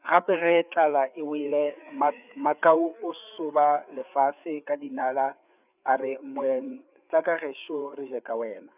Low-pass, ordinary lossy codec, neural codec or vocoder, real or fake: 3.6 kHz; none; codec, 16 kHz, 4 kbps, FreqCodec, larger model; fake